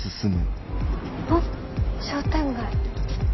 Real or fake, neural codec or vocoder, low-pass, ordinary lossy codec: real; none; 7.2 kHz; MP3, 24 kbps